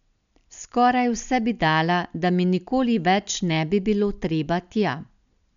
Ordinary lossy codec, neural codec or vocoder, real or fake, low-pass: none; none; real; 7.2 kHz